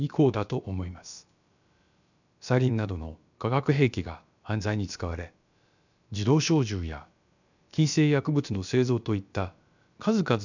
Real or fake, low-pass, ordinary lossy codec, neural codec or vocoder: fake; 7.2 kHz; none; codec, 16 kHz, about 1 kbps, DyCAST, with the encoder's durations